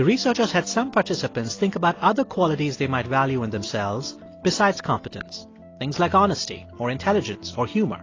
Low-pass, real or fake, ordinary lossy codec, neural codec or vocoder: 7.2 kHz; real; AAC, 32 kbps; none